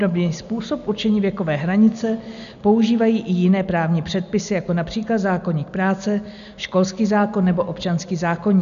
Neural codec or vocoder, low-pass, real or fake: none; 7.2 kHz; real